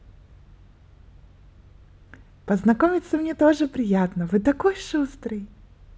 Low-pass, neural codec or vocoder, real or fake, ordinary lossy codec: none; none; real; none